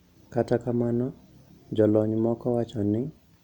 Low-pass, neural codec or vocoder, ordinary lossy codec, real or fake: 19.8 kHz; none; Opus, 64 kbps; real